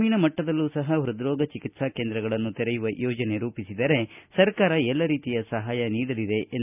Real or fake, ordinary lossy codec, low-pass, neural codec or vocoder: real; none; 3.6 kHz; none